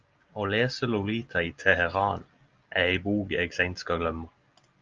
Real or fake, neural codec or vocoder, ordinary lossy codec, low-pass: real; none; Opus, 24 kbps; 7.2 kHz